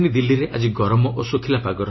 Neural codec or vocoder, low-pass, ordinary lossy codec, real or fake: none; 7.2 kHz; MP3, 24 kbps; real